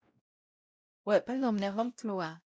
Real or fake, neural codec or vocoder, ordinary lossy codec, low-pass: fake; codec, 16 kHz, 0.5 kbps, X-Codec, WavLM features, trained on Multilingual LibriSpeech; none; none